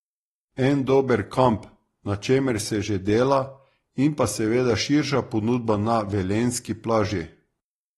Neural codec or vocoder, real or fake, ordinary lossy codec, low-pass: none; real; AAC, 32 kbps; 19.8 kHz